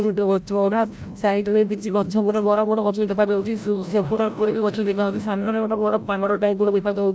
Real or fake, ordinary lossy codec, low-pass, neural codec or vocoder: fake; none; none; codec, 16 kHz, 0.5 kbps, FreqCodec, larger model